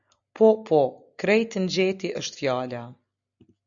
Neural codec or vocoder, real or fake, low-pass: none; real; 7.2 kHz